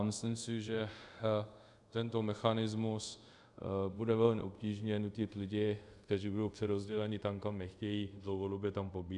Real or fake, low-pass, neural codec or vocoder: fake; 10.8 kHz; codec, 24 kHz, 0.5 kbps, DualCodec